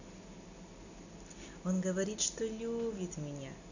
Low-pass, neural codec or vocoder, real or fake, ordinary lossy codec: 7.2 kHz; none; real; none